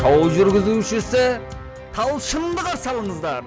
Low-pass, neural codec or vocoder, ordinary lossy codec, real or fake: none; none; none; real